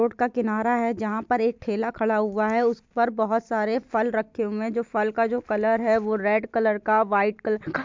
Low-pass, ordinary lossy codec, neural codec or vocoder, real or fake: 7.2 kHz; MP3, 64 kbps; none; real